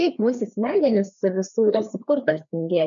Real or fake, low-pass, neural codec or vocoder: fake; 7.2 kHz; codec, 16 kHz, 2 kbps, FreqCodec, larger model